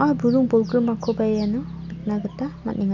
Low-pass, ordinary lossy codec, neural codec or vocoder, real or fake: 7.2 kHz; none; none; real